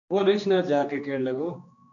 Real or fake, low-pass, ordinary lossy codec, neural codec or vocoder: fake; 7.2 kHz; MP3, 48 kbps; codec, 16 kHz, 2 kbps, X-Codec, HuBERT features, trained on general audio